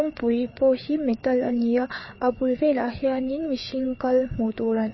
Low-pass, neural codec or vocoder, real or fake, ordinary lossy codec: 7.2 kHz; codec, 16 kHz, 4 kbps, FreqCodec, larger model; fake; MP3, 24 kbps